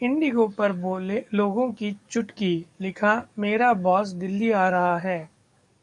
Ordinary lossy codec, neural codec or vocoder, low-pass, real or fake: MP3, 96 kbps; codec, 44.1 kHz, 7.8 kbps, DAC; 10.8 kHz; fake